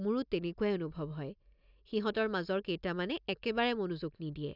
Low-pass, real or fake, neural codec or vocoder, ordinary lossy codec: 5.4 kHz; real; none; none